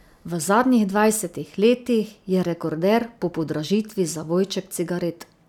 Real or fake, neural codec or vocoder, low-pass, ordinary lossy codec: real; none; 19.8 kHz; none